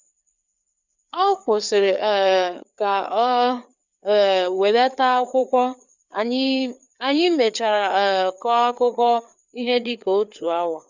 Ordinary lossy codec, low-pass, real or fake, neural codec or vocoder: none; 7.2 kHz; fake; codec, 16 kHz, 4 kbps, FreqCodec, larger model